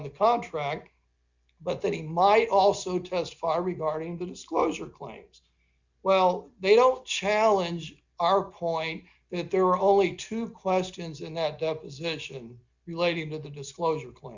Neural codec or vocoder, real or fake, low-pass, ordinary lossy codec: none; real; 7.2 kHz; Opus, 64 kbps